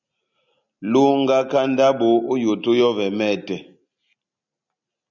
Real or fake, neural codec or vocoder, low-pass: real; none; 7.2 kHz